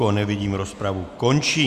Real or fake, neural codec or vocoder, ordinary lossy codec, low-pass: real; none; Opus, 64 kbps; 14.4 kHz